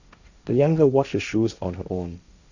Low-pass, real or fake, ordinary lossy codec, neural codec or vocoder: 7.2 kHz; fake; none; codec, 16 kHz, 1.1 kbps, Voila-Tokenizer